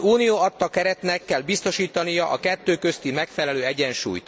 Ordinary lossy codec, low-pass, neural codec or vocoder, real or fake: none; none; none; real